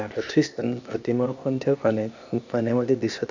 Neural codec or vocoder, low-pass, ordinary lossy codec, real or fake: codec, 16 kHz, 0.8 kbps, ZipCodec; 7.2 kHz; none; fake